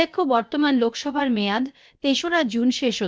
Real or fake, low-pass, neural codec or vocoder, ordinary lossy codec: fake; none; codec, 16 kHz, about 1 kbps, DyCAST, with the encoder's durations; none